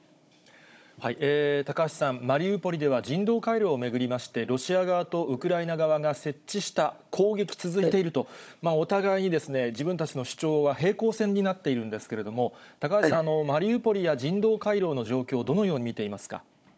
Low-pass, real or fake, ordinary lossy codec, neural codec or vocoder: none; fake; none; codec, 16 kHz, 16 kbps, FunCodec, trained on Chinese and English, 50 frames a second